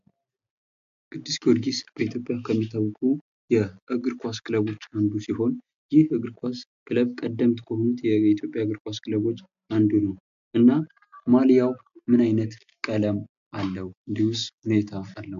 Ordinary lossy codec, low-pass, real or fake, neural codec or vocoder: MP3, 64 kbps; 7.2 kHz; real; none